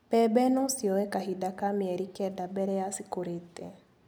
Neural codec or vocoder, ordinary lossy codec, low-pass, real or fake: none; none; none; real